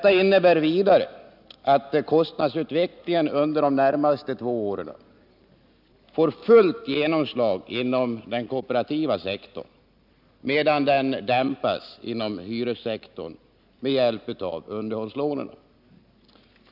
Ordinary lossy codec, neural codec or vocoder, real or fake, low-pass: none; none; real; 5.4 kHz